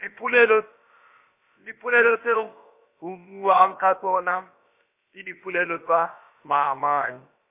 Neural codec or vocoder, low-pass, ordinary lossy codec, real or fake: codec, 16 kHz, about 1 kbps, DyCAST, with the encoder's durations; 3.6 kHz; MP3, 32 kbps; fake